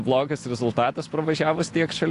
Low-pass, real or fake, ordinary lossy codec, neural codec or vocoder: 10.8 kHz; real; AAC, 48 kbps; none